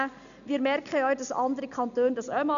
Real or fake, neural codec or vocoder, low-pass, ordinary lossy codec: real; none; 7.2 kHz; none